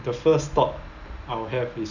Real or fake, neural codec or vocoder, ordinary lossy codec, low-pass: real; none; none; 7.2 kHz